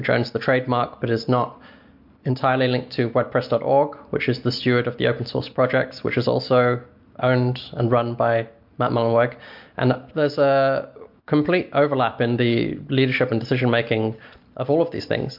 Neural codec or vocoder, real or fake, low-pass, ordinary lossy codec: none; real; 5.4 kHz; MP3, 48 kbps